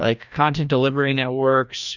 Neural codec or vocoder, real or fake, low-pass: codec, 16 kHz, 1 kbps, FreqCodec, larger model; fake; 7.2 kHz